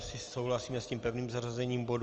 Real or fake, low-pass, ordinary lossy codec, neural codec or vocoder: real; 7.2 kHz; Opus, 24 kbps; none